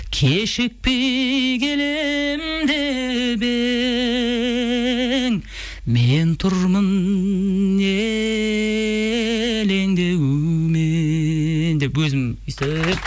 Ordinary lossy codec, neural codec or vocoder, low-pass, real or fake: none; none; none; real